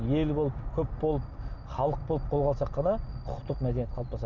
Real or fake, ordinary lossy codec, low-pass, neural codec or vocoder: real; none; 7.2 kHz; none